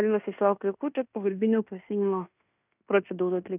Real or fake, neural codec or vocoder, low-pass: fake; codec, 16 kHz in and 24 kHz out, 0.9 kbps, LongCat-Audio-Codec, fine tuned four codebook decoder; 3.6 kHz